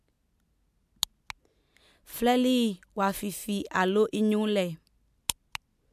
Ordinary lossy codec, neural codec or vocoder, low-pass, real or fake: MP3, 96 kbps; none; 14.4 kHz; real